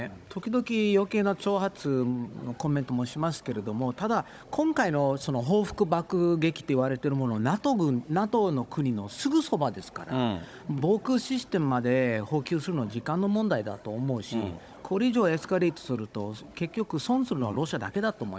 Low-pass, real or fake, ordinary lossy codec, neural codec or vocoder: none; fake; none; codec, 16 kHz, 16 kbps, FunCodec, trained on Chinese and English, 50 frames a second